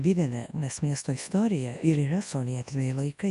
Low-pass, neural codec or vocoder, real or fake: 10.8 kHz; codec, 24 kHz, 0.9 kbps, WavTokenizer, large speech release; fake